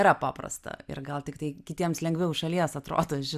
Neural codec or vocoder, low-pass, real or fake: none; 14.4 kHz; real